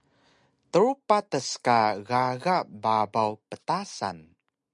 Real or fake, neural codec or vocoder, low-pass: real; none; 10.8 kHz